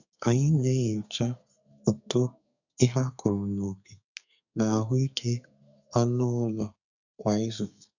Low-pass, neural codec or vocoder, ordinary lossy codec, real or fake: 7.2 kHz; codec, 32 kHz, 1.9 kbps, SNAC; none; fake